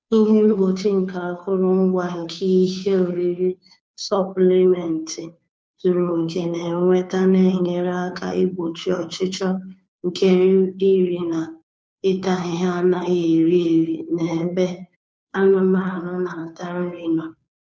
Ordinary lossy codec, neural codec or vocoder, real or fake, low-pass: none; codec, 16 kHz, 2 kbps, FunCodec, trained on Chinese and English, 25 frames a second; fake; none